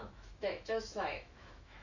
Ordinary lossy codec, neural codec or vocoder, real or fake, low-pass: none; autoencoder, 48 kHz, 128 numbers a frame, DAC-VAE, trained on Japanese speech; fake; 7.2 kHz